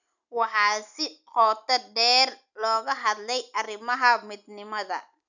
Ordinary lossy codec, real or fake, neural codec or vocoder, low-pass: none; real; none; 7.2 kHz